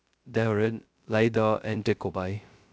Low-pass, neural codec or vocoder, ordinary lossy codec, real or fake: none; codec, 16 kHz, 0.2 kbps, FocalCodec; none; fake